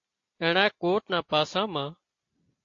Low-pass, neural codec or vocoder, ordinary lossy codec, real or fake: 7.2 kHz; none; AAC, 48 kbps; real